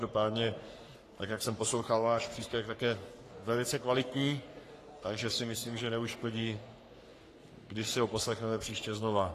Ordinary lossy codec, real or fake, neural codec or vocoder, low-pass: AAC, 48 kbps; fake; codec, 44.1 kHz, 3.4 kbps, Pupu-Codec; 14.4 kHz